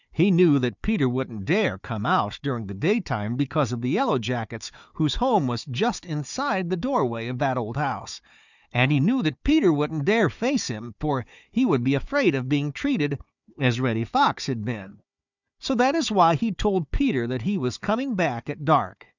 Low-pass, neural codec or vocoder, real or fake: 7.2 kHz; codec, 16 kHz, 4 kbps, FunCodec, trained on Chinese and English, 50 frames a second; fake